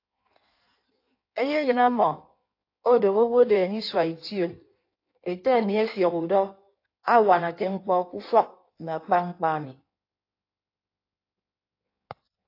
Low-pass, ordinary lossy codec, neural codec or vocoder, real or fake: 5.4 kHz; AAC, 32 kbps; codec, 16 kHz in and 24 kHz out, 1.1 kbps, FireRedTTS-2 codec; fake